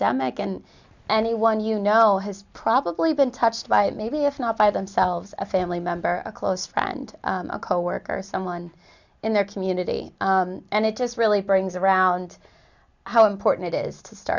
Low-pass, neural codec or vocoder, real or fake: 7.2 kHz; none; real